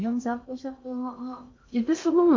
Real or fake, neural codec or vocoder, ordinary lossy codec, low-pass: fake; codec, 16 kHz in and 24 kHz out, 0.8 kbps, FocalCodec, streaming, 65536 codes; MP3, 48 kbps; 7.2 kHz